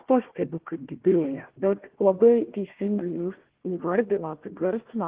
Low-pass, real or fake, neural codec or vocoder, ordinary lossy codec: 3.6 kHz; fake; codec, 16 kHz, 1 kbps, FunCodec, trained on Chinese and English, 50 frames a second; Opus, 16 kbps